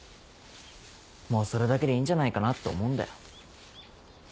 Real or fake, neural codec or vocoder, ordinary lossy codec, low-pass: real; none; none; none